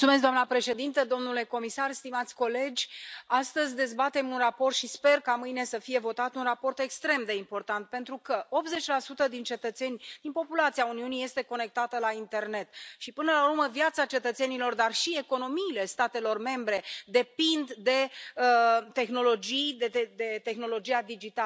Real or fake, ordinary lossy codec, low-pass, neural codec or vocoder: real; none; none; none